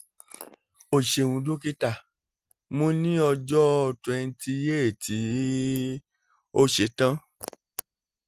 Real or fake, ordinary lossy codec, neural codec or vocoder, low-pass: real; Opus, 24 kbps; none; 14.4 kHz